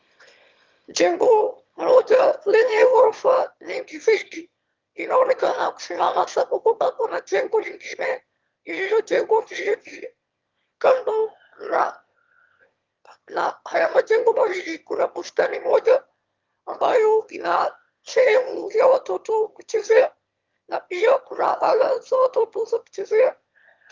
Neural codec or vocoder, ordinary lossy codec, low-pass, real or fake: autoencoder, 22.05 kHz, a latent of 192 numbers a frame, VITS, trained on one speaker; Opus, 32 kbps; 7.2 kHz; fake